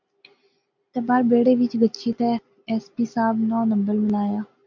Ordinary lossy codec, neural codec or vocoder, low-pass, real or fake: MP3, 64 kbps; none; 7.2 kHz; real